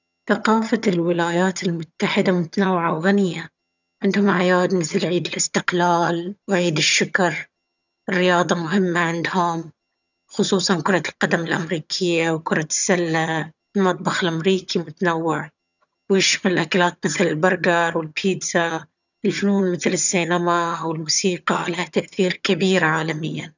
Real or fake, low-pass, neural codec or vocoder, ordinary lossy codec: fake; 7.2 kHz; vocoder, 22.05 kHz, 80 mel bands, HiFi-GAN; none